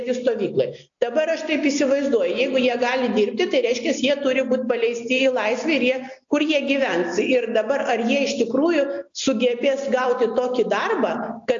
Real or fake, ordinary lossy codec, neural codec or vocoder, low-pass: real; AAC, 64 kbps; none; 7.2 kHz